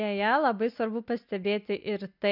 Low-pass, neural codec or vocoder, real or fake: 5.4 kHz; none; real